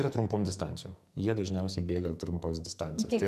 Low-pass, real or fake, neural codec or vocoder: 14.4 kHz; fake; codec, 44.1 kHz, 2.6 kbps, SNAC